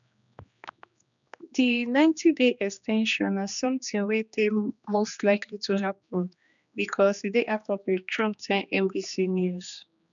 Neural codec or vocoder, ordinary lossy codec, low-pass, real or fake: codec, 16 kHz, 2 kbps, X-Codec, HuBERT features, trained on general audio; none; 7.2 kHz; fake